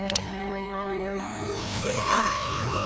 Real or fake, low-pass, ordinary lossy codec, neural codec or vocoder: fake; none; none; codec, 16 kHz, 1 kbps, FreqCodec, larger model